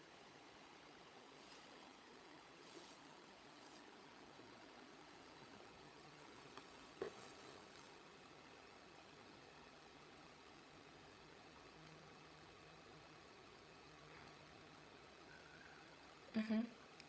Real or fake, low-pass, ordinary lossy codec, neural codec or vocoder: fake; none; none; codec, 16 kHz, 16 kbps, FunCodec, trained on LibriTTS, 50 frames a second